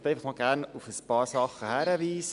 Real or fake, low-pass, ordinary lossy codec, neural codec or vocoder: real; 10.8 kHz; none; none